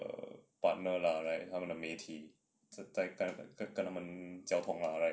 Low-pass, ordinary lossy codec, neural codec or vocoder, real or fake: none; none; none; real